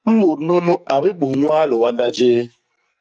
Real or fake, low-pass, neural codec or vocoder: fake; 9.9 kHz; codec, 44.1 kHz, 2.6 kbps, SNAC